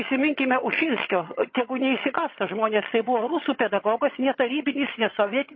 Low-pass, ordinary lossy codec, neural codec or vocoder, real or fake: 7.2 kHz; MP3, 24 kbps; vocoder, 22.05 kHz, 80 mel bands, HiFi-GAN; fake